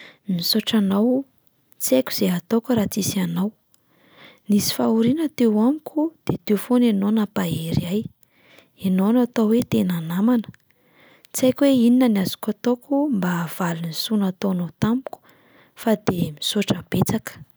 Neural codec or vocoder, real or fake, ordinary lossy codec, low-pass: none; real; none; none